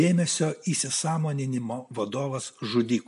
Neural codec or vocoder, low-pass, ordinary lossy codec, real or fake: none; 10.8 kHz; MP3, 64 kbps; real